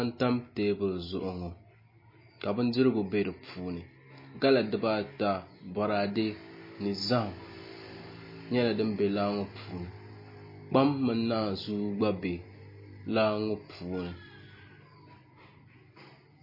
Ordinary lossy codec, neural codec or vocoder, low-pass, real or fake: MP3, 24 kbps; none; 5.4 kHz; real